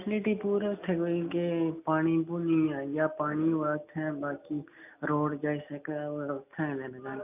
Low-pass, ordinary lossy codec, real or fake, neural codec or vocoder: 3.6 kHz; none; real; none